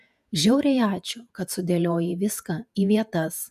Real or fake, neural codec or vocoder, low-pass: fake; vocoder, 48 kHz, 128 mel bands, Vocos; 14.4 kHz